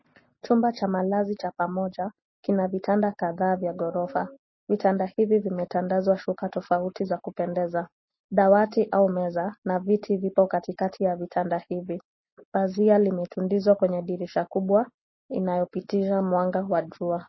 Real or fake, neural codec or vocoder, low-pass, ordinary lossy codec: real; none; 7.2 kHz; MP3, 24 kbps